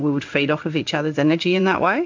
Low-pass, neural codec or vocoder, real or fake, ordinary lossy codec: 7.2 kHz; codec, 16 kHz in and 24 kHz out, 1 kbps, XY-Tokenizer; fake; MP3, 64 kbps